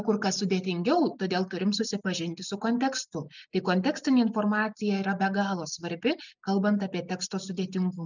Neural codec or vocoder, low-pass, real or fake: none; 7.2 kHz; real